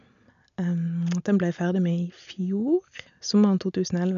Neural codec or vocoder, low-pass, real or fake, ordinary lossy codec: none; 7.2 kHz; real; none